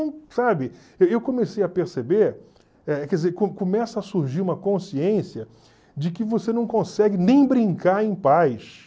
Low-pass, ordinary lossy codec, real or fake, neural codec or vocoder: none; none; real; none